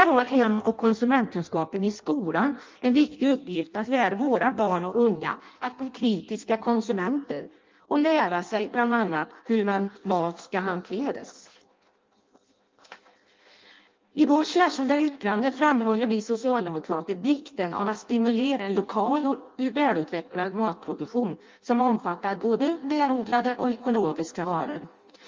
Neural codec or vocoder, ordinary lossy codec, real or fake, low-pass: codec, 16 kHz in and 24 kHz out, 0.6 kbps, FireRedTTS-2 codec; Opus, 32 kbps; fake; 7.2 kHz